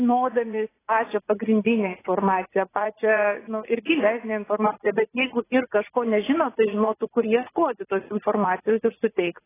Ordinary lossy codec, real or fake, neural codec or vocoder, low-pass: AAC, 16 kbps; fake; vocoder, 44.1 kHz, 80 mel bands, Vocos; 3.6 kHz